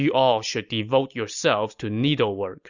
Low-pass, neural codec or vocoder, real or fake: 7.2 kHz; none; real